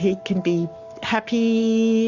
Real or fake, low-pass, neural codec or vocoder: fake; 7.2 kHz; codec, 44.1 kHz, 7.8 kbps, Pupu-Codec